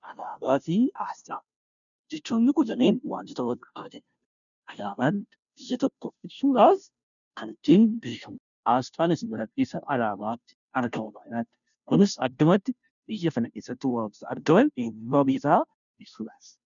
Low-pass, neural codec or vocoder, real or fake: 7.2 kHz; codec, 16 kHz, 0.5 kbps, FunCodec, trained on Chinese and English, 25 frames a second; fake